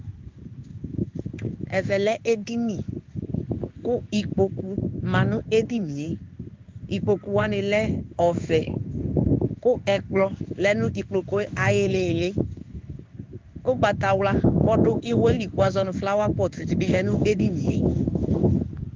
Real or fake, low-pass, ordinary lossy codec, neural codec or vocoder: fake; 7.2 kHz; Opus, 24 kbps; codec, 16 kHz in and 24 kHz out, 1 kbps, XY-Tokenizer